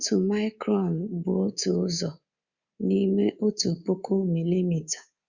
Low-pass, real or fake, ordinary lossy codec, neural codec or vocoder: 7.2 kHz; fake; Opus, 64 kbps; codec, 24 kHz, 3.1 kbps, DualCodec